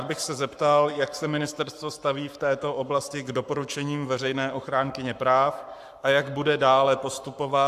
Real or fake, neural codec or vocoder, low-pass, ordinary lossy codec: fake; codec, 44.1 kHz, 7.8 kbps, Pupu-Codec; 14.4 kHz; AAC, 96 kbps